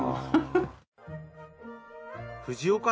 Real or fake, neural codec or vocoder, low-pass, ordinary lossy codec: real; none; none; none